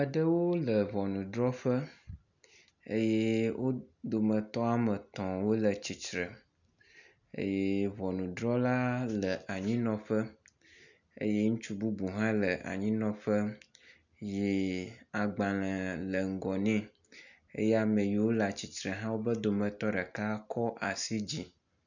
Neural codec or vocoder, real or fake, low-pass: none; real; 7.2 kHz